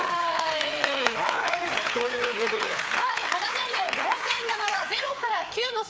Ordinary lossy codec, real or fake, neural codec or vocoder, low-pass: none; fake; codec, 16 kHz, 4 kbps, FreqCodec, larger model; none